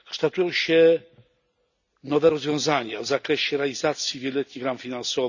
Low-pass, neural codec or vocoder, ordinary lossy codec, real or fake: 7.2 kHz; none; none; real